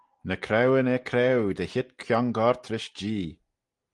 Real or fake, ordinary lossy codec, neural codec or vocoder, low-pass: real; Opus, 24 kbps; none; 10.8 kHz